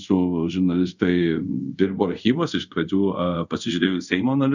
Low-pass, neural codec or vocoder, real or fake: 7.2 kHz; codec, 24 kHz, 0.5 kbps, DualCodec; fake